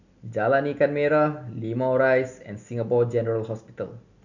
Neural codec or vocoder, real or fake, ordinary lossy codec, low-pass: none; real; none; 7.2 kHz